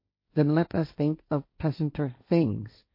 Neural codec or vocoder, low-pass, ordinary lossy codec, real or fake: codec, 16 kHz, 1.1 kbps, Voila-Tokenizer; 5.4 kHz; MP3, 32 kbps; fake